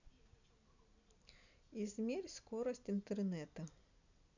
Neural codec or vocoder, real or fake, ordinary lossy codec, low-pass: none; real; none; 7.2 kHz